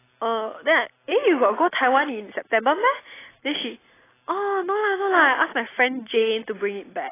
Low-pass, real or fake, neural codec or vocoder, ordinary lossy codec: 3.6 kHz; real; none; AAC, 16 kbps